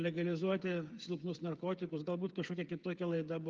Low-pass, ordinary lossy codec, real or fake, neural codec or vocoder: 7.2 kHz; Opus, 24 kbps; fake; codec, 16 kHz, 8 kbps, FreqCodec, smaller model